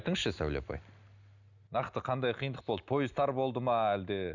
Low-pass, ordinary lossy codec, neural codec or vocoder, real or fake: 7.2 kHz; none; none; real